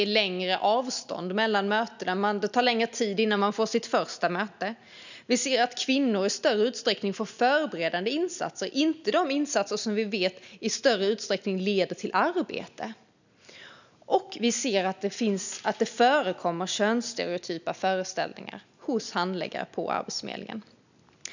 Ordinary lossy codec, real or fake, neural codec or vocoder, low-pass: none; real; none; 7.2 kHz